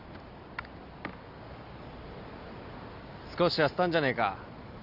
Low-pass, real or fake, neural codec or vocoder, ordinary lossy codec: 5.4 kHz; real; none; Opus, 64 kbps